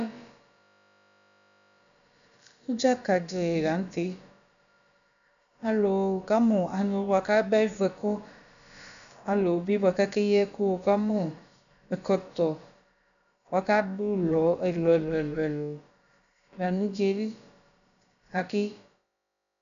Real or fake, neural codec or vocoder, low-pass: fake; codec, 16 kHz, about 1 kbps, DyCAST, with the encoder's durations; 7.2 kHz